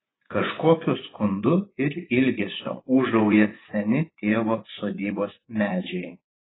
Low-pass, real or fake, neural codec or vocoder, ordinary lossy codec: 7.2 kHz; fake; vocoder, 24 kHz, 100 mel bands, Vocos; AAC, 16 kbps